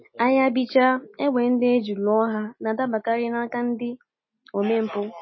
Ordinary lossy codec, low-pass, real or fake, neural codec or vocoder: MP3, 24 kbps; 7.2 kHz; real; none